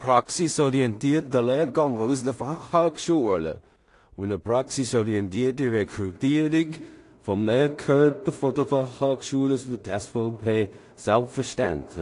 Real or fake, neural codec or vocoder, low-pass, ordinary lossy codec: fake; codec, 16 kHz in and 24 kHz out, 0.4 kbps, LongCat-Audio-Codec, two codebook decoder; 10.8 kHz; AAC, 48 kbps